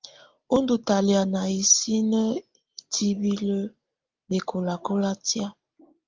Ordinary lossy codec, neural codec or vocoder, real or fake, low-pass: Opus, 32 kbps; none; real; 7.2 kHz